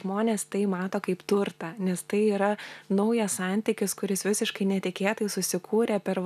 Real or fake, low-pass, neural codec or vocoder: real; 14.4 kHz; none